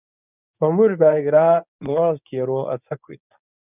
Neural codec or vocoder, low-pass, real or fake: codec, 24 kHz, 0.9 kbps, WavTokenizer, medium speech release version 1; 3.6 kHz; fake